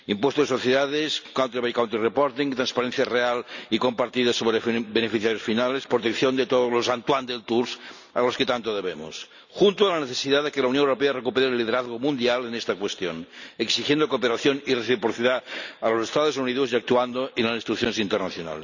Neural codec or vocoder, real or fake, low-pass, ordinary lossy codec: none; real; 7.2 kHz; none